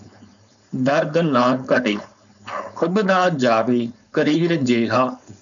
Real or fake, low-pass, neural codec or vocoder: fake; 7.2 kHz; codec, 16 kHz, 4.8 kbps, FACodec